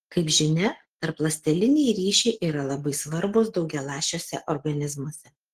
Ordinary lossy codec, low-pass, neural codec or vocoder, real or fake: Opus, 16 kbps; 14.4 kHz; none; real